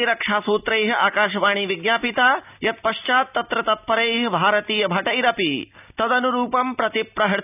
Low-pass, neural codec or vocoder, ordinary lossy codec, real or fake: 3.6 kHz; none; none; real